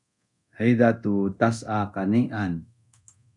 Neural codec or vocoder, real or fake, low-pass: codec, 24 kHz, 0.9 kbps, DualCodec; fake; 10.8 kHz